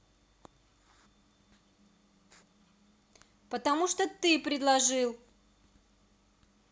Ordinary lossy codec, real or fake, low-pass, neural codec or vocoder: none; real; none; none